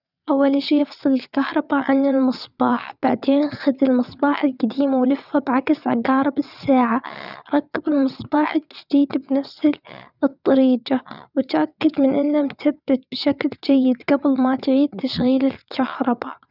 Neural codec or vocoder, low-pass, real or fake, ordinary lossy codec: vocoder, 22.05 kHz, 80 mel bands, WaveNeXt; 5.4 kHz; fake; none